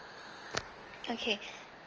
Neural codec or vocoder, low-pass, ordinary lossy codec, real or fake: none; 7.2 kHz; Opus, 24 kbps; real